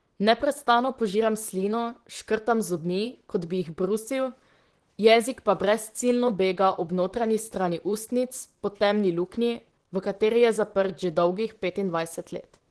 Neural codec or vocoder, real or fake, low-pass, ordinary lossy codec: vocoder, 44.1 kHz, 128 mel bands, Pupu-Vocoder; fake; 10.8 kHz; Opus, 16 kbps